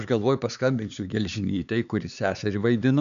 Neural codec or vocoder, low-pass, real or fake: codec, 16 kHz, 4 kbps, X-Codec, HuBERT features, trained on LibriSpeech; 7.2 kHz; fake